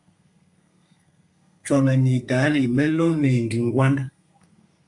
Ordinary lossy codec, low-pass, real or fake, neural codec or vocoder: AAC, 64 kbps; 10.8 kHz; fake; codec, 32 kHz, 1.9 kbps, SNAC